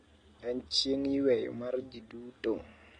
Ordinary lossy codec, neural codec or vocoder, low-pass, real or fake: AAC, 32 kbps; none; 9.9 kHz; real